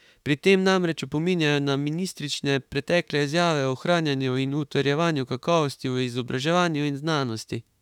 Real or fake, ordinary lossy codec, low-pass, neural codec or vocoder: fake; none; 19.8 kHz; autoencoder, 48 kHz, 32 numbers a frame, DAC-VAE, trained on Japanese speech